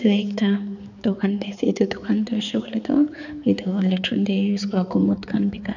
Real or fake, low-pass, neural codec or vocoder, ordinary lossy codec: fake; 7.2 kHz; codec, 16 kHz, 4 kbps, X-Codec, HuBERT features, trained on balanced general audio; none